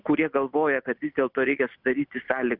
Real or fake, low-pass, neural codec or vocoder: real; 5.4 kHz; none